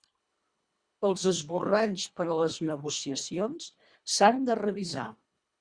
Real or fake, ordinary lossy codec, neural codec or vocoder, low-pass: fake; Opus, 64 kbps; codec, 24 kHz, 1.5 kbps, HILCodec; 9.9 kHz